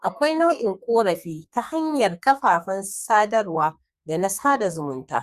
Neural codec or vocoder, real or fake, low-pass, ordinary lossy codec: codec, 32 kHz, 1.9 kbps, SNAC; fake; 14.4 kHz; Opus, 64 kbps